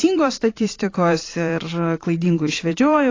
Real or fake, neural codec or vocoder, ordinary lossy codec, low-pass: fake; vocoder, 44.1 kHz, 128 mel bands every 512 samples, BigVGAN v2; AAC, 32 kbps; 7.2 kHz